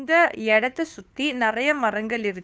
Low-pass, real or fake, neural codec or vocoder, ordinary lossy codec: none; fake; codec, 16 kHz, 2 kbps, FunCodec, trained on Chinese and English, 25 frames a second; none